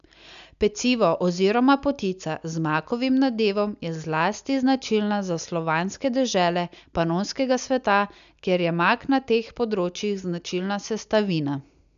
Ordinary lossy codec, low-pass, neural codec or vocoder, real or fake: none; 7.2 kHz; none; real